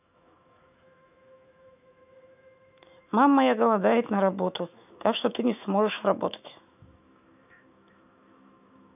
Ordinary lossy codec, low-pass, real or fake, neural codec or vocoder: none; 3.6 kHz; fake; autoencoder, 48 kHz, 128 numbers a frame, DAC-VAE, trained on Japanese speech